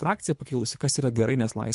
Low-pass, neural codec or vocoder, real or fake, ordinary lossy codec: 10.8 kHz; codec, 24 kHz, 3 kbps, HILCodec; fake; MP3, 64 kbps